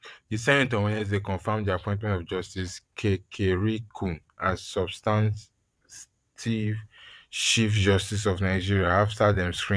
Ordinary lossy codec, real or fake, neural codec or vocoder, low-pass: none; fake; vocoder, 22.05 kHz, 80 mel bands, WaveNeXt; none